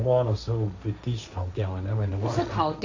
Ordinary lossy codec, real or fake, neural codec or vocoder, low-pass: AAC, 48 kbps; fake; codec, 16 kHz, 1.1 kbps, Voila-Tokenizer; 7.2 kHz